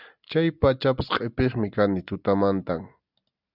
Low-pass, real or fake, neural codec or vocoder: 5.4 kHz; real; none